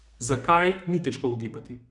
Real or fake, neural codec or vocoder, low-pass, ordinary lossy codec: fake; codec, 32 kHz, 1.9 kbps, SNAC; 10.8 kHz; none